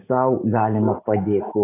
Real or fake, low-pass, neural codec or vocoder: real; 3.6 kHz; none